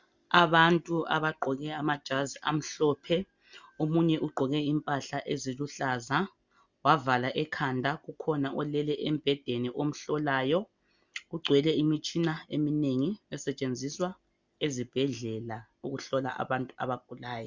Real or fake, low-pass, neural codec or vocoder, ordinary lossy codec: real; 7.2 kHz; none; Opus, 64 kbps